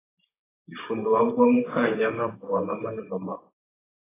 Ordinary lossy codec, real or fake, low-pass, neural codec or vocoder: AAC, 16 kbps; fake; 3.6 kHz; vocoder, 44.1 kHz, 128 mel bands, Pupu-Vocoder